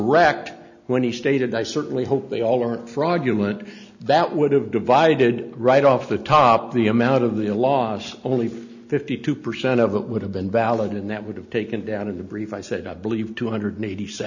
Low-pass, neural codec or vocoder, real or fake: 7.2 kHz; none; real